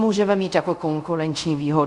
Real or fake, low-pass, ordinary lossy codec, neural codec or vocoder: fake; 10.8 kHz; Opus, 64 kbps; codec, 24 kHz, 0.5 kbps, DualCodec